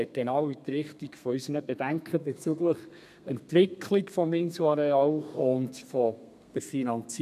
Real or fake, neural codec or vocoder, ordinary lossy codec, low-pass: fake; codec, 32 kHz, 1.9 kbps, SNAC; none; 14.4 kHz